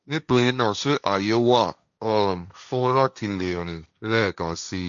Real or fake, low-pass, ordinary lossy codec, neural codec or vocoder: fake; 7.2 kHz; none; codec, 16 kHz, 1.1 kbps, Voila-Tokenizer